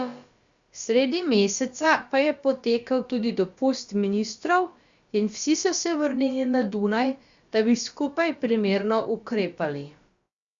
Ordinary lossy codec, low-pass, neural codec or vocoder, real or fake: Opus, 64 kbps; 7.2 kHz; codec, 16 kHz, about 1 kbps, DyCAST, with the encoder's durations; fake